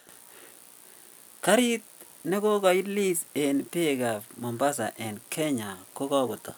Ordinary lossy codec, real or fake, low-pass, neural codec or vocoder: none; real; none; none